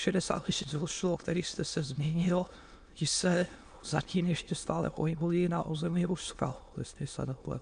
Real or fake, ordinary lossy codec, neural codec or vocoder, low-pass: fake; AAC, 64 kbps; autoencoder, 22.05 kHz, a latent of 192 numbers a frame, VITS, trained on many speakers; 9.9 kHz